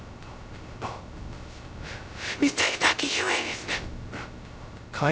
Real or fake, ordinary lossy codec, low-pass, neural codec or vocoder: fake; none; none; codec, 16 kHz, 0.2 kbps, FocalCodec